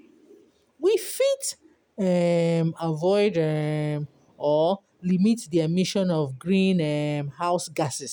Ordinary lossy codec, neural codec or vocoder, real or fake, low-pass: none; none; real; none